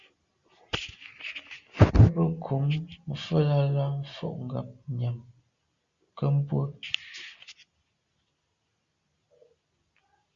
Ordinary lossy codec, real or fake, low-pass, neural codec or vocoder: Opus, 64 kbps; real; 7.2 kHz; none